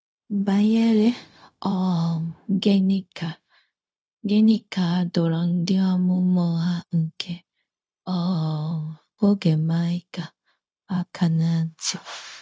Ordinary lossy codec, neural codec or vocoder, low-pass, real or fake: none; codec, 16 kHz, 0.4 kbps, LongCat-Audio-Codec; none; fake